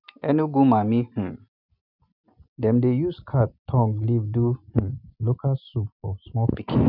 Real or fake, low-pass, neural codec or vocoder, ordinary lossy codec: real; 5.4 kHz; none; none